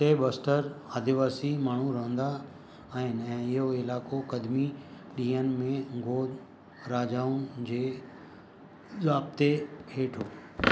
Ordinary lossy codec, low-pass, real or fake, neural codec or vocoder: none; none; real; none